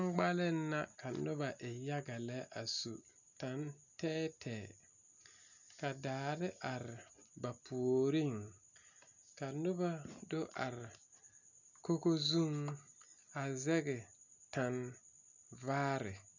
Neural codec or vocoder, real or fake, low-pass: none; real; 7.2 kHz